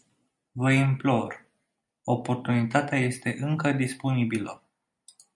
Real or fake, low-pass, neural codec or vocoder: real; 10.8 kHz; none